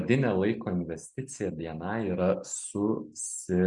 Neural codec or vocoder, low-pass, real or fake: none; 10.8 kHz; real